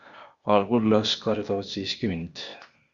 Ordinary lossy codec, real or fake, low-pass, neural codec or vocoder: Opus, 64 kbps; fake; 7.2 kHz; codec, 16 kHz, 0.8 kbps, ZipCodec